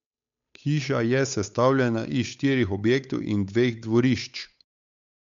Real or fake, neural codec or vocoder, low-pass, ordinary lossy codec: fake; codec, 16 kHz, 8 kbps, FunCodec, trained on Chinese and English, 25 frames a second; 7.2 kHz; MP3, 64 kbps